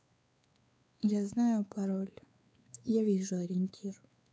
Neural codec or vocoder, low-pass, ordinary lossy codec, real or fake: codec, 16 kHz, 4 kbps, X-Codec, WavLM features, trained on Multilingual LibriSpeech; none; none; fake